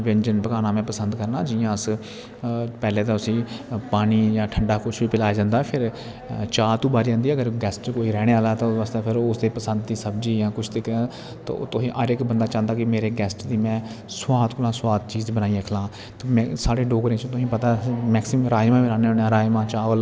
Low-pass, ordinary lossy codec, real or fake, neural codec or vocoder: none; none; real; none